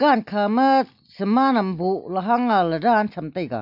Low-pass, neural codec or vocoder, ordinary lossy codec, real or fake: 5.4 kHz; none; none; real